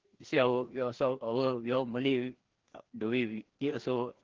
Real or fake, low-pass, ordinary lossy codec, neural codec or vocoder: fake; 7.2 kHz; Opus, 16 kbps; codec, 16 kHz, 2 kbps, FreqCodec, larger model